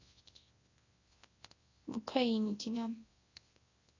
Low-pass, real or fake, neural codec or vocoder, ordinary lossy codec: 7.2 kHz; fake; codec, 24 kHz, 0.9 kbps, WavTokenizer, large speech release; AAC, 32 kbps